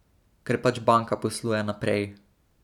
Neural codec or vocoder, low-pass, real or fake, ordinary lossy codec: none; 19.8 kHz; real; none